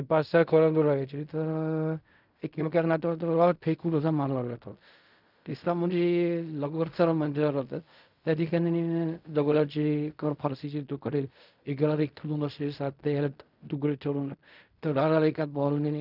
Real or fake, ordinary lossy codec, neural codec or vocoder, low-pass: fake; none; codec, 16 kHz in and 24 kHz out, 0.4 kbps, LongCat-Audio-Codec, fine tuned four codebook decoder; 5.4 kHz